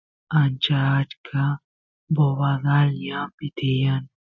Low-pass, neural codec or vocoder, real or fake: 7.2 kHz; none; real